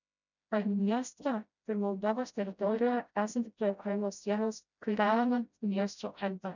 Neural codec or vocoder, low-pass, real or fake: codec, 16 kHz, 0.5 kbps, FreqCodec, smaller model; 7.2 kHz; fake